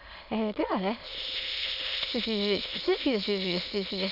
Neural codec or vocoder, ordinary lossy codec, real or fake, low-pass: autoencoder, 22.05 kHz, a latent of 192 numbers a frame, VITS, trained on many speakers; AAC, 48 kbps; fake; 5.4 kHz